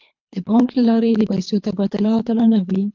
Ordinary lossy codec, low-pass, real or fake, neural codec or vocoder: MP3, 64 kbps; 7.2 kHz; fake; codec, 24 kHz, 3 kbps, HILCodec